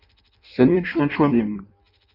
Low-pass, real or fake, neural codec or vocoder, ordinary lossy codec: 5.4 kHz; fake; codec, 16 kHz in and 24 kHz out, 0.6 kbps, FireRedTTS-2 codec; none